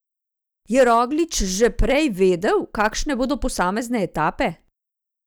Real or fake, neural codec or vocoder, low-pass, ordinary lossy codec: real; none; none; none